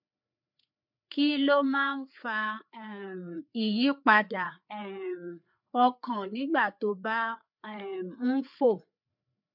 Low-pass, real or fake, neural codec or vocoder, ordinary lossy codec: 5.4 kHz; fake; codec, 16 kHz, 4 kbps, FreqCodec, larger model; MP3, 48 kbps